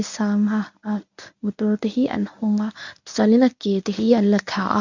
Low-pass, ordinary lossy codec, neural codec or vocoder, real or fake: 7.2 kHz; none; codec, 24 kHz, 0.9 kbps, WavTokenizer, medium speech release version 1; fake